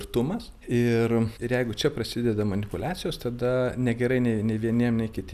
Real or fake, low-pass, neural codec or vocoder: real; 14.4 kHz; none